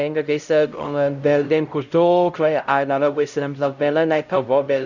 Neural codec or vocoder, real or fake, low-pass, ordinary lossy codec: codec, 16 kHz, 0.5 kbps, X-Codec, HuBERT features, trained on LibriSpeech; fake; 7.2 kHz; none